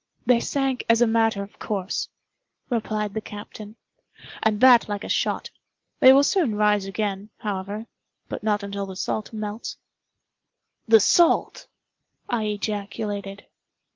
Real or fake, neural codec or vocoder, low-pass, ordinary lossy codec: real; none; 7.2 kHz; Opus, 32 kbps